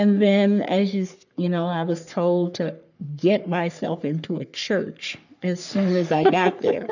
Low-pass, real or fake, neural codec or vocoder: 7.2 kHz; fake; codec, 44.1 kHz, 3.4 kbps, Pupu-Codec